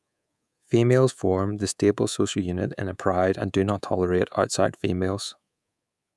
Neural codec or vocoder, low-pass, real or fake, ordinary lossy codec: codec, 24 kHz, 3.1 kbps, DualCodec; none; fake; none